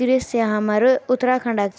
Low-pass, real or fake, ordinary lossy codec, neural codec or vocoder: none; real; none; none